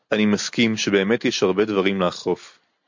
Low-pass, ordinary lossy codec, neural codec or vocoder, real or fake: 7.2 kHz; MP3, 48 kbps; none; real